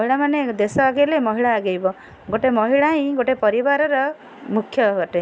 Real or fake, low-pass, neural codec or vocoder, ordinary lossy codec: real; none; none; none